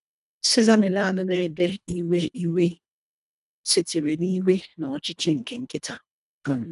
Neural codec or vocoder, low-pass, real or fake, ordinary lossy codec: codec, 24 kHz, 1.5 kbps, HILCodec; 10.8 kHz; fake; none